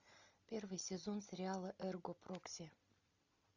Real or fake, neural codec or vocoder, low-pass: real; none; 7.2 kHz